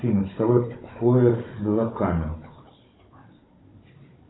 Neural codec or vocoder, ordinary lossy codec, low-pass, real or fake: codec, 16 kHz, 16 kbps, FunCodec, trained on Chinese and English, 50 frames a second; AAC, 16 kbps; 7.2 kHz; fake